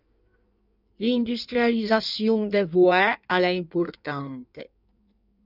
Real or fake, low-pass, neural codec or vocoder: fake; 5.4 kHz; codec, 16 kHz in and 24 kHz out, 1.1 kbps, FireRedTTS-2 codec